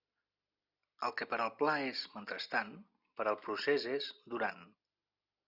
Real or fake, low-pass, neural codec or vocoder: real; 5.4 kHz; none